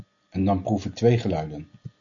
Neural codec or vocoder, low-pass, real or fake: none; 7.2 kHz; real